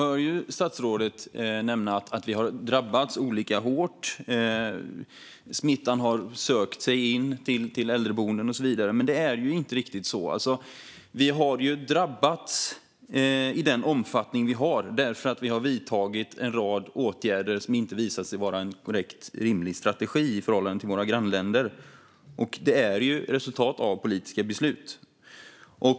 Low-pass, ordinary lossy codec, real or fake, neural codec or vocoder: none; none; real; none